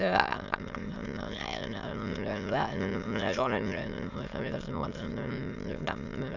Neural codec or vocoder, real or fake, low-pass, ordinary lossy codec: autoencoder, 22.05 kHz, a latent of 192 numbers a frame, VITS, trained on many speakers; fake; 7.2 kHz; none